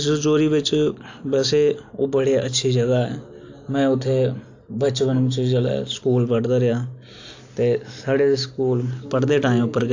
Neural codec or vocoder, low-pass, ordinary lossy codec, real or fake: none; 7.2 kHz; AAC, 48 kbps; real